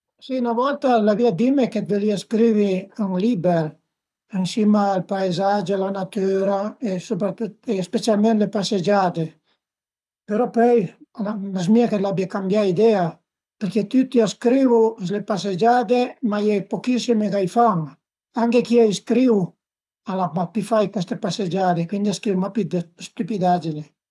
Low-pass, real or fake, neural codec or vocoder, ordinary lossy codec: none; fake; codec, 24 kHz, 6 kbps, HILCodec; none